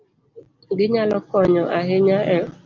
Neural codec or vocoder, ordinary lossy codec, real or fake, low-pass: none; Opus, 24 kbps; real; 7.2 kHz